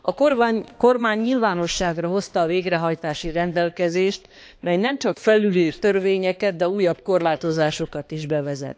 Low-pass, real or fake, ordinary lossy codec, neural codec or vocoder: none; fake; none; codec, 16 kHz, 2 kbps, X-Codec, HuBERT features, trained on balanced general audio